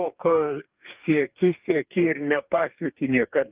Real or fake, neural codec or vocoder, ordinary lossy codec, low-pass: fake; codec, 44.1 kHz, 2.6 kbps, DAC; Opus, 24 kbps; 3.6 kHz